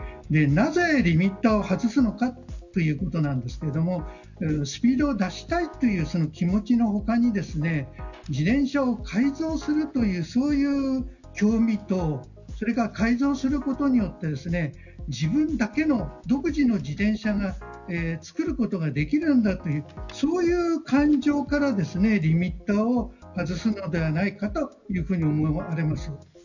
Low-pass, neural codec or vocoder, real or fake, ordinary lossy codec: 7.2 kHz; none; real; none